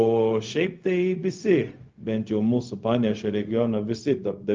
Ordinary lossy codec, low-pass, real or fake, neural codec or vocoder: Opus, 24 kbps; 7.2 kHz; fake; codec, 16 kHz, 0.4 kbps, LongCat-Audio-Codec